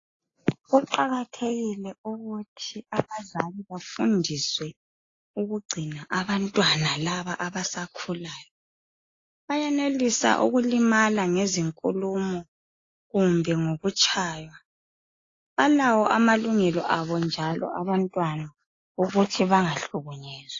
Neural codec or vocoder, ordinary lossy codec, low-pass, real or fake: none; AAC, 32 kbps; 7.2 kHz; real